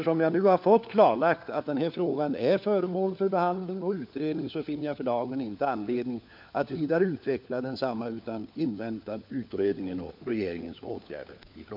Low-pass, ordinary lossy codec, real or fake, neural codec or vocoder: 5.4 kHz; MP3, 48 kbps; fake; codec, 16 kHz, 4 kbps, FunCodec, trained on LibriTTS, 50 frames a second